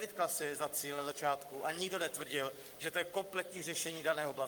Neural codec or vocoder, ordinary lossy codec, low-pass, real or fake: codec, 44.1 kHz, 7.8 kbps, Pupu-Codec; Opus, 32 kbps; 19.8 kHz; fake